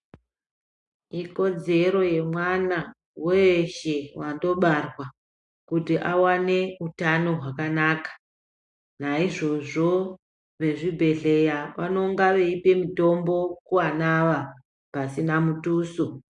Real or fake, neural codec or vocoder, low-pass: real; none; 10.8 kHz